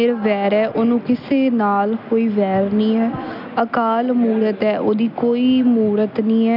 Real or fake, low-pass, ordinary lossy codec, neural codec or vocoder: real; 5.4 kHz; none; none